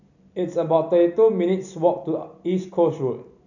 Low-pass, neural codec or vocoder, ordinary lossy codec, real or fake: 7.2 kHz; none; none; real